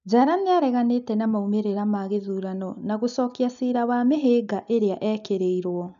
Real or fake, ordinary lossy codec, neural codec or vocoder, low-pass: real; none; none; 7.2 kHz